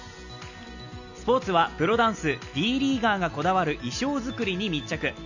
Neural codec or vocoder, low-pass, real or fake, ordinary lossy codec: none; 7.2 kHz; real; none